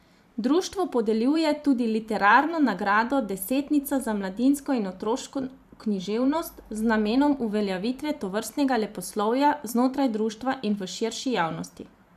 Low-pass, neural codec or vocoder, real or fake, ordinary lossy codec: 14.4 kHz; vocoder, 44.1 kHz, 128 mel bands every 512 samples, BigVGAN v2; fake; none